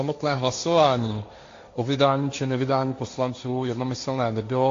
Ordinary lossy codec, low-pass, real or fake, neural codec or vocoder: AAC, 48 kbps; 7.2 kHz; fake; codec, 16 kHz, 1.1 kbps, Voila-Tokenizer